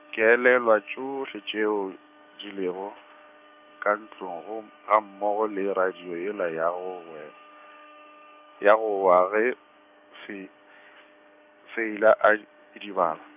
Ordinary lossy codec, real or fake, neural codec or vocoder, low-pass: none; fake; codec, 16 kHz, 6 kbps, DAC; 3.6 kHz